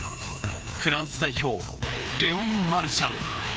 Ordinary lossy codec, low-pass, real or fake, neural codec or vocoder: none; none; fake; codec, 16 kHz, 2 kbps, FreqCodec, larger model